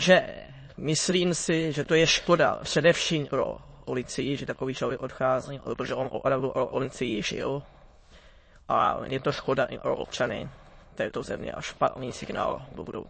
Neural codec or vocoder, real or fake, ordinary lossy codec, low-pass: autoencoder, 22.05 kHz, a latent of 192 numbers a frame, VITS, trained on many speakers; fake; MP3, 32 kbps; 9.9 kHz